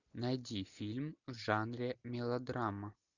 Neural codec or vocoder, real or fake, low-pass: none; real; 7.2 kHz